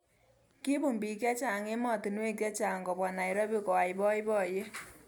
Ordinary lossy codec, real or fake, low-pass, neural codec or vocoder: none; real; none; none